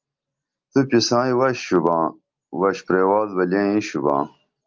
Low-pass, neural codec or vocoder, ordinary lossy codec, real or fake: 7.2 kHz; none; Opus, 24 kbps; real